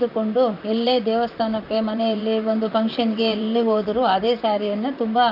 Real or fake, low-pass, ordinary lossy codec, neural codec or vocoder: fake; 5.4 kHz; none; vocoder, 44.1 kHz, 128 mel bands, Pupu-Vocoder